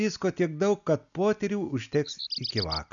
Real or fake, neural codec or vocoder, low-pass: real; none; 7.2 kHz